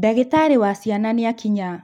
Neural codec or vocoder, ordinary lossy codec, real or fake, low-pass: none; none; real; 19.8 kHz